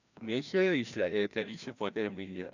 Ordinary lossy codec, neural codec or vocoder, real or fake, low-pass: none; codec, 16 kHz, 1 kbps, FreqCodec, larger model; fake; 7.2 kHz